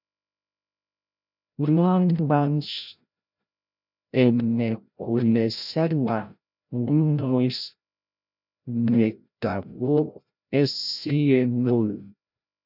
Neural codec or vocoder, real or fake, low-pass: codec, 16 kHz, 0.5 kbps, FreqCodec, larger model; fake; 5.4 kHz